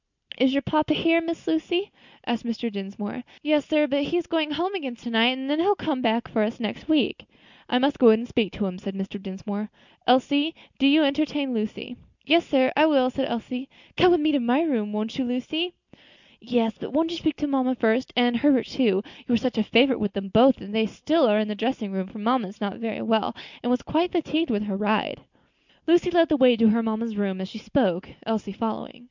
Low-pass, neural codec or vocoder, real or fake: 7.2 kHz; none; real